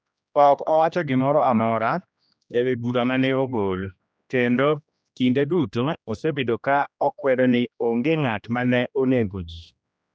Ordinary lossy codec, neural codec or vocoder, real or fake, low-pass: none; codec, 16 kHz, 1 kbps, X-Codec, HuBERT features, trained on general audio; fake; none